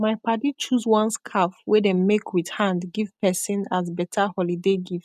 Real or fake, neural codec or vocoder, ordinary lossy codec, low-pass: real; none; none; 14.4 kHz